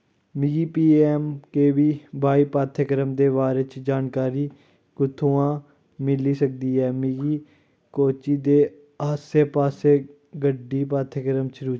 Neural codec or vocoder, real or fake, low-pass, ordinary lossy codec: none; real; none; none